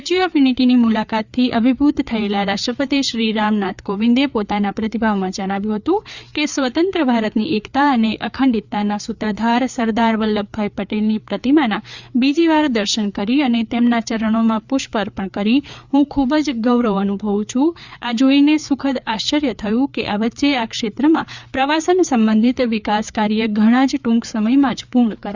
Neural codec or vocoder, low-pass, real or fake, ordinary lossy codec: codec, 16 kHz, 4 kbps, FreqCodec, larger model; 7.2 kHz; fake; Opus, 64 kbps